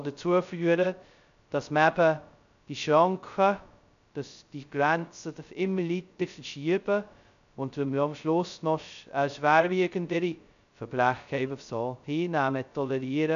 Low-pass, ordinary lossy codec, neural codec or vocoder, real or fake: 7.2 kHz; none; codec, 16 kHz, 0.2 kbps, FocalCodec; fake